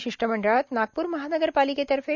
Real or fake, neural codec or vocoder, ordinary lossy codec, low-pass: real; none; none; 7.2 kHz